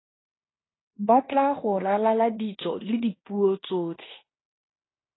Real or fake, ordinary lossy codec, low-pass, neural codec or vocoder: fake; AAC, 16 kbps; 7.2 kHz; codec, 16 kHz in and 24 kHz out, 0.9 kbps, LongCat-Audio-Codec, fine tuned four codebook decoder